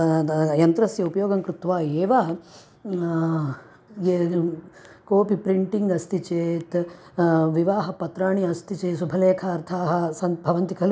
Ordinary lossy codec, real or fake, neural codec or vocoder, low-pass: none; real; none; none